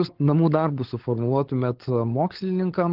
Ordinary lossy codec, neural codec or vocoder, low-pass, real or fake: Opus, 16 kbps; codec, 16 kHz, 16 kbps, FunCodec, trained on LibriTTS, 50 frames a second; 5.4 kHz; fake